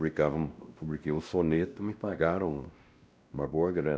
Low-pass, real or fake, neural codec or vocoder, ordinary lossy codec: none; fake; codec, 16 kHz, 1 kbps, X-Codec, WavLM features, trained on Multilingual LibriSpeech; none